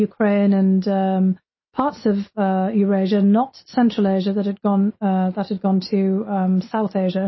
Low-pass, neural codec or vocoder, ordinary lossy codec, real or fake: 7.2 kHz; none; MP3, 24 kbps; real